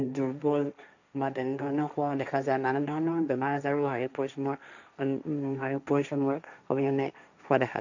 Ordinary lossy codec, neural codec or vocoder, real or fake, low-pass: none; codec, 16 kHz, 1.1 kbps, Voila-Tokenizer; fake; 7.2 kHz